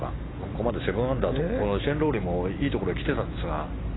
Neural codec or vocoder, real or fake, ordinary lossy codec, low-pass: none; real; AAC, 16 kbps; 7.2 kHz